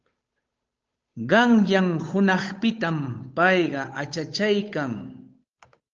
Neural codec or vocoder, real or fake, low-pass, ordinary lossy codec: codec, 16 kHz, 8 kbps, FunCodec, trained on Chinese and English, 25 frames a second; fake; 7.2 kHz; Opus, 24 kbps